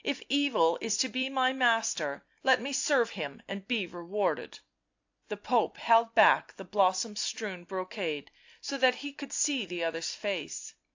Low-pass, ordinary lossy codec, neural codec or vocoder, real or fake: 7.2 kHz; AAC, 48 kbps; none; real